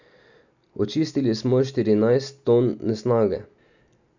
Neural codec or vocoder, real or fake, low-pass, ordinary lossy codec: none; real; 7.2 kHz; none